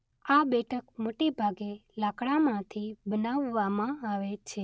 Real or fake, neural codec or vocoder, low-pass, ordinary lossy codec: real; none; none; none